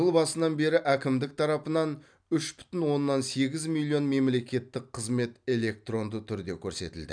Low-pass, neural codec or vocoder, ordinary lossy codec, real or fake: 9.9 kHz; none; none; real